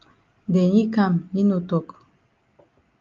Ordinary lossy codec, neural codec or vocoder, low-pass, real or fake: Opus, 32 kbps; none; 7.2 kHz; real